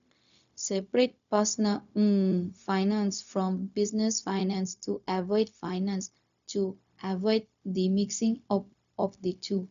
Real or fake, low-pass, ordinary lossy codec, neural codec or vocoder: fake; 7.2 kHz; none; codec, 16 kHz, 0.4 kbps, LongCat-Audio-Codec